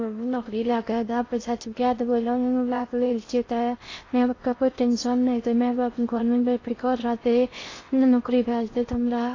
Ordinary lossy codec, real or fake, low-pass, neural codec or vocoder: AAC, 32 kbps; fake; 7.2 kHz; codec, 16 kHz in and 24 kHz out, 0.6 kbps, FocalCodec, streaming, 4096 codes